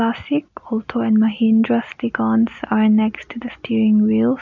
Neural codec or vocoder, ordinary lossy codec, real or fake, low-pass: none; none; real; 7.2 kHz